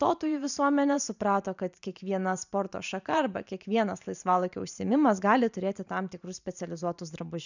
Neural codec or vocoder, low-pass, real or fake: vocoder, 44.1 kHz, 128 mel bands every 512 samples, BigVGAN v2; 7.2 kHz; fake